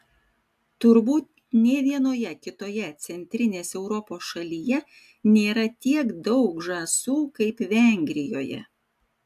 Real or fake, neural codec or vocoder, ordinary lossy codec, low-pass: real; none; AAC, 96 kbps; 14.4 kHz